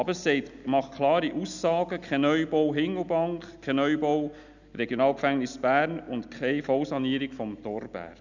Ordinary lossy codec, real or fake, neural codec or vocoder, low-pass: none; real; none; 7.2 kHz